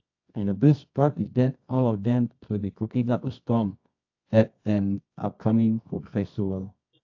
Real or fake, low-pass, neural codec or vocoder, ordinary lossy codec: fake; 7.2 kHz; codec, 24 kHz, 0.9 kbps, WavTokenizer, medium music audio release; AAC, 48 kbps